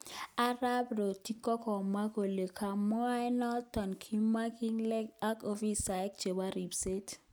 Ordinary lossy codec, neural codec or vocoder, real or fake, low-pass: none; none; real; none